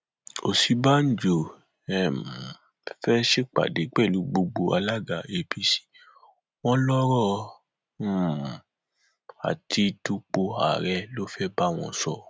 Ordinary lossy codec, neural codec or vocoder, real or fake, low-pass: none; none; real; none